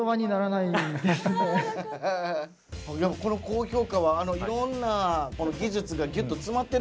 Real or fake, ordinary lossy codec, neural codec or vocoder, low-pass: real; none; none; none